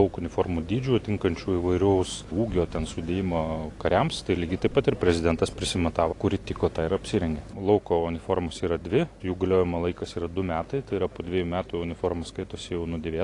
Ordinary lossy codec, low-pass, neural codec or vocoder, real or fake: AAC, 48 kbps; 10.8 kHz; none; real